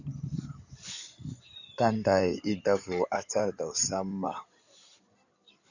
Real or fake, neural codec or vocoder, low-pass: fake; codec, 16 kHz in and 24 kHz out, 2.2 kbps, FireRedTTS-2 codec; 7.2 kHz